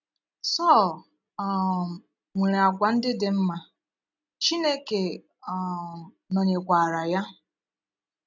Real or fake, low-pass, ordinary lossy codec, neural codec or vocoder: real; 7.2 kHz; none; none